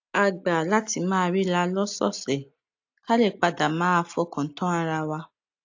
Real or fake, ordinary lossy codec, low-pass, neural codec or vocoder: real; AAC, 48 kbps; 7.2 kHz; none